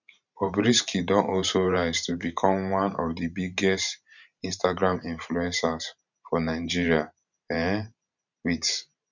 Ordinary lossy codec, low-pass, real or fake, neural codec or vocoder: none; 7.2 kHz; fake; vocoder, 44.1 kHz, 128 mel bands every 512 samples, BigVGAN v2